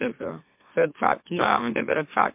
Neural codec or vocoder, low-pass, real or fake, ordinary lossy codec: autoencoder, 44.1 kHz, a latent of 192 numbers a frame, MeloTTS; 3.6 kHz; fake; MP3, 32 kbps